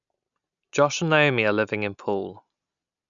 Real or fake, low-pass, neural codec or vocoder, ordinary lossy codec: real; 7.2 kHz; none; none